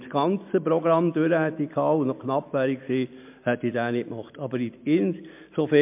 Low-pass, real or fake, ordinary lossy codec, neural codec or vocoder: 3.6 kHz; real; MP3, 32 kbps; none